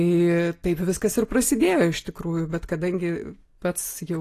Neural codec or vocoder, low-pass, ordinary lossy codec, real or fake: none; 14.4 kHz; AAC, 48 kbps; real